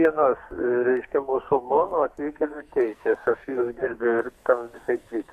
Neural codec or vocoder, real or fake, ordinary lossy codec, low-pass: codec, 44.1 kHz, 2.6 kbps, SNAC; fake; MP3, 64 kbps; 14.4 kHz